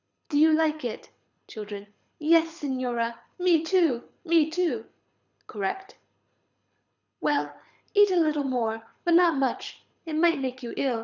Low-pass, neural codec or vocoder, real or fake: 7.2 kHz; codec, 24 kHz, 6 kbps, HILCodec; fake